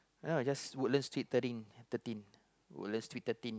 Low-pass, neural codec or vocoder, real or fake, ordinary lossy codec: none; none; real; none